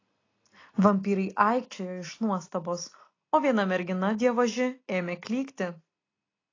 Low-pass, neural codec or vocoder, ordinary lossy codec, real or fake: 7.2 kHz; none; AAC, 32 kbps; real